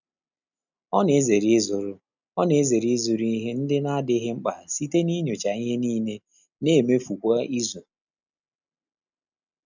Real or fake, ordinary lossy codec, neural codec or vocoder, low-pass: real; none; none; 7.2 kHz